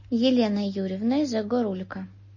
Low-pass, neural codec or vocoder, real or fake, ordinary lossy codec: 7.2 kHz; none; real; MP3, 32 kbps